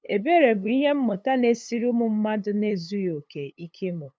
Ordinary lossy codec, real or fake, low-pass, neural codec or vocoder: none; fake; none; codec, 16 kHz, 8 kbps, FunCodec, trained on LibriTTS, 25 frames a second